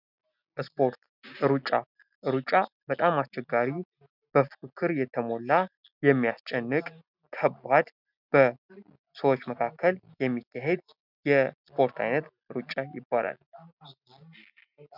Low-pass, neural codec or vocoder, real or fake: 5.4 kHz; none; real